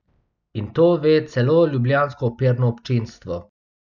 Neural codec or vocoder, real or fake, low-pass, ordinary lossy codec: none; real; 7.2 kHz; none